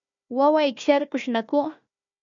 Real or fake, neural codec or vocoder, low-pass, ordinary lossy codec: fake; codec, 16 kHz, 1 kbps, FunCodec, trained on Chinese and English, 50 frames a second; 7.2 kHz; MP3, 64 kbps